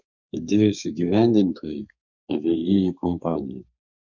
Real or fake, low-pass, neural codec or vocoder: fake; 7.2 kHz; codec, 32 kHz, 1.9 kbps, SNAC